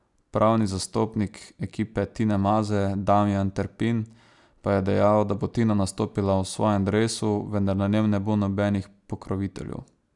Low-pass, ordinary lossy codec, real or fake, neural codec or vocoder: 10.8 kHz; none; real; none